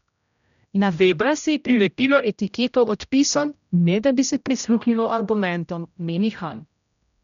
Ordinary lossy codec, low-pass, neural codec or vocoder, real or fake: none; 7.2 kHz; codec, 16 kHz, 0.5 kbps, X-Codec, HuBERT features, trained on general audio; fake